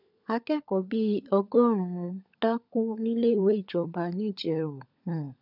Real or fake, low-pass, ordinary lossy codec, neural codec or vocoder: fake; 5.4 kHz; none; codec, 16 kHz, 16 kbps, FunCodec, trained on LibriTTS, 50 frames a second